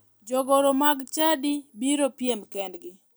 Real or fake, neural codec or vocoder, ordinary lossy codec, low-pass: real; none; none; none